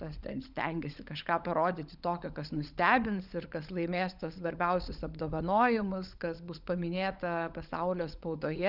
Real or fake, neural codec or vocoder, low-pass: fake; codec, 16 kHz, 16 kbps, FunCodec, trained on LibriTTS, 50 frames a second; 5.4 kHz